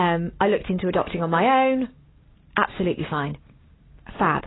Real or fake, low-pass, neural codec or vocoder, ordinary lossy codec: real; 7.2 kHz; none; AAC, 16 kbps